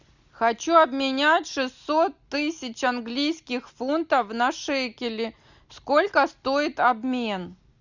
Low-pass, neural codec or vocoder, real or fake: 7.2 kHz; none; real